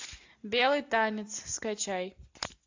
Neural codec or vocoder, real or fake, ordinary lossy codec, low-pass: none; real; AAC, 48 kbps; 7.2 kHz